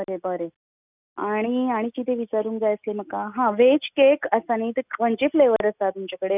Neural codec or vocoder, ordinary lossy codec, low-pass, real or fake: none; none; 3.6 kHz; real